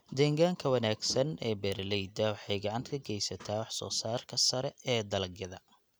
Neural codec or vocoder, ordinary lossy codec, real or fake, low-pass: none; none; real; none